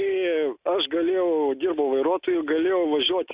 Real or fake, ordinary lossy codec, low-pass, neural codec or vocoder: real; Opus, 64 kbps; 3.6 kHz; none